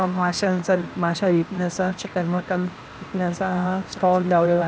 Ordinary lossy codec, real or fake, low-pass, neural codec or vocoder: none; fake; none; codec, 16 kHz, 0.8 kbps, ZipCodec